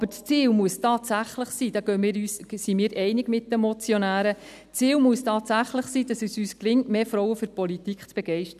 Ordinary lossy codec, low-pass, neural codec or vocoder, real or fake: none; 14.4 kHz; none; real